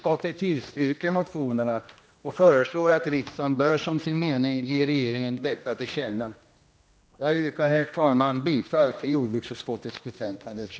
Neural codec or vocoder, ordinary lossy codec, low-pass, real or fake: codec, 16 kHz, 1 kbps, X-Codec, HuBERT features, trained on general audio; none; none; fake